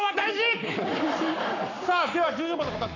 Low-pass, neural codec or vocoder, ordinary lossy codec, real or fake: 7.2 kHz; codec, 44.1 kHz, 7.8 kbps, Pupu-Codec; none; fake